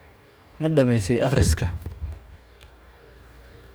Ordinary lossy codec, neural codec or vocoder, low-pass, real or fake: none; codec, 44.1 kHz, 2.6 kbps, DAC; none; fake